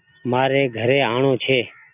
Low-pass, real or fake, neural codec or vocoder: 3.6 kHz; real; none